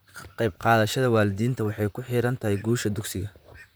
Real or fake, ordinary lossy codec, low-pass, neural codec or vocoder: fake; none; none; vocoder, 44.1 kHz, 128 mel bands, Pupu-Vocoder